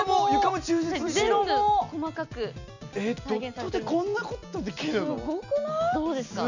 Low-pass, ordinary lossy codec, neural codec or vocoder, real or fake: 7.2 kHz; none; none; real